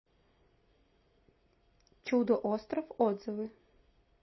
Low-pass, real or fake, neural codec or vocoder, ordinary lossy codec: 7.2 kHz; real; none; MP3, 24 kbps